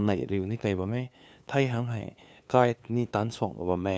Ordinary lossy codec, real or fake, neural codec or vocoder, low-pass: none; fake; codec, 16 kHz, 2 kbps, FunCodec, trained on LibriTTS, 25 frames a second; none